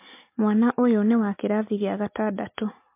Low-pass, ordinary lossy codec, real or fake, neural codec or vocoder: 3.6 kHz; MP3, 24 kbps; real; none